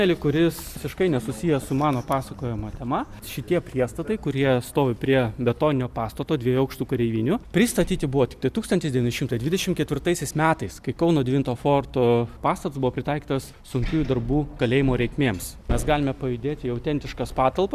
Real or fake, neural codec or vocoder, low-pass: real; none; 14.4 kHz